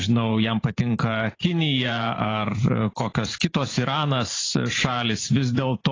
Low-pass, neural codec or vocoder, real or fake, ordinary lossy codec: 7.2 kHz; vocoder, 44.1 kHz, 128 mel bands every 512 samples, BigVGAN v2; fake; AAC, 32 kbps